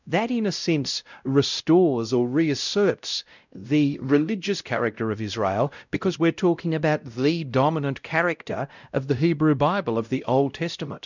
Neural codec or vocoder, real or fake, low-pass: codec, 16 kHz, 0.5 kbps, X-Codec, WavLM features, trained on Multilingual LibriSpeech; fake; 7.2 kHz